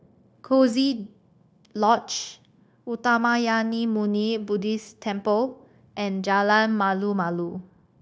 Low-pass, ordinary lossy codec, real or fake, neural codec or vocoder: none; none; fake; codec, 16 kHz, 0.9 kbps, LongCat-Audio-Codec